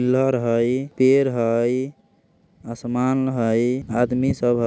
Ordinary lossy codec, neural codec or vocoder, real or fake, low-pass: none; none; real; none